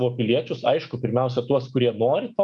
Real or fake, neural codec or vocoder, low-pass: fake; autoencoder, 48 kHz, 128 numbers a frame, DAC-VAE, trained on Japanese speech; 10.8 kHz